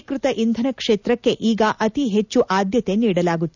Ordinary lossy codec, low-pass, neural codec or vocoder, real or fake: none; 7.2 kHz; none; real